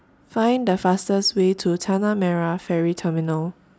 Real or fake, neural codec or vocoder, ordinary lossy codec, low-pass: real; none; none; none